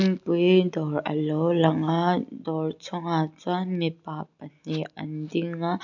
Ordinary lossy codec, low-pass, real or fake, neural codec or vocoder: none; 7.2 kHz; real; none